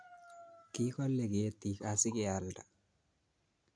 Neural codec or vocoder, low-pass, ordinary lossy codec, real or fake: none; 9.9 kHz; none; real